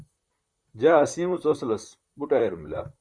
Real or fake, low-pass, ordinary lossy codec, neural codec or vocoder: fake; 9.9 kHz; Opus, 64 kbps; vocoder, 44.1 kHz, 128 mel bands, Pupu-Vocoder